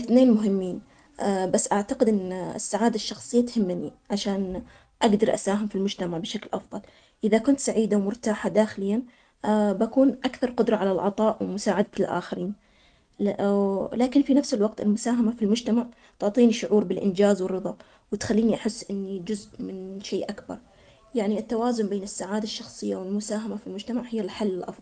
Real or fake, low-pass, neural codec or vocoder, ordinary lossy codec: real; 9.9 kHz; none; Opus, 32 kbps